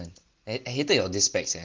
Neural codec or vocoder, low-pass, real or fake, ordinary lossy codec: none; 7.2 kHz; real; Opus, 16 kbps